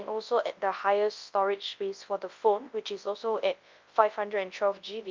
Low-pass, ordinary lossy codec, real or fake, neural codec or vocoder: 7.2 kHz; Opus, 24 kbps; fake; codec, 24 kHz, 0.9 kbps, WavTokenizer, large speech release